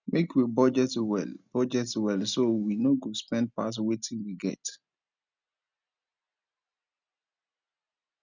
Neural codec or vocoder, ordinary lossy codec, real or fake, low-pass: none; none; real; 7.2 kHz